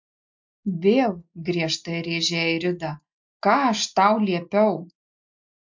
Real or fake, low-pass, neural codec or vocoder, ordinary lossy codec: real; 7.2 kHz; none; MP3, 48 kbps